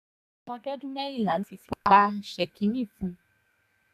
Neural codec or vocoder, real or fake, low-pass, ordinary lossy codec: codec, 32 kHz, 1.9 kbps, SNAC; fake; 14.4 kHz; none